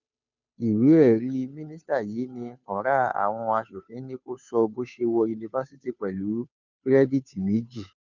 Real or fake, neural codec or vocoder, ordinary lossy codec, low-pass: fake; codec, 16 kHz, 2 kbps, FunCodec, trained on Chinese and English, 25 frames a second; none; 7.2 kHz